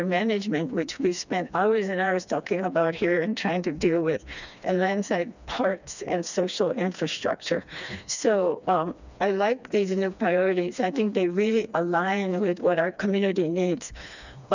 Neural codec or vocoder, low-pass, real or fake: codec, 16 kHz, 2 kbps, FreqCodec, smaller model; 7.2 kHz; fake